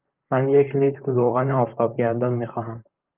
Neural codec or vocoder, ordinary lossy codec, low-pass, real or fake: vocoder, 44.1 kHz, 128 mel bands, Pupu-Vocoder; Opus, 24 kbps; 3.6 kHz; fake